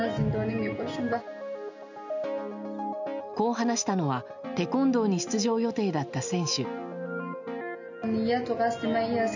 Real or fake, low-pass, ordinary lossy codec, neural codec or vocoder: real; 7.2 kHz; none; none